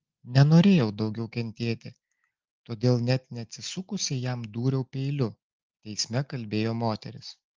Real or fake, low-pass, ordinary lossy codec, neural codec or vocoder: real; 7.2 kHz; Opus, 32 kbps; none